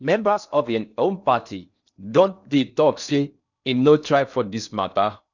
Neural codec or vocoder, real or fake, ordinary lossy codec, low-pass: codec, 16 kHz in and 24 kHz out, 0.6 kbps, FocalCodec, streaming, 4096 codes; fake; none; 7.2 kHz